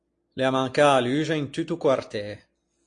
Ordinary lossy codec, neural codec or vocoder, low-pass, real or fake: AAC, 48 kbps; none; 9.9 kHz; real